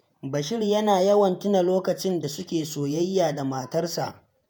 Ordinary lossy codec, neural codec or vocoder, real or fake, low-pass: none; vocoder, 48 kHz, 128 mel bands, Vocos; fake; none